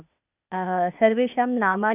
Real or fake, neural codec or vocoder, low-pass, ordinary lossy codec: fake; codec, 16 kHz, 0.8 kbps, ZipCodec; 3.6 kHz; none